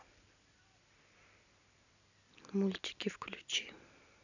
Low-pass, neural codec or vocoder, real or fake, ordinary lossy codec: 7.2 kHz; none; real; none